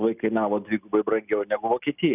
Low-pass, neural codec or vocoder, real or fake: 3.6 kHz; none; real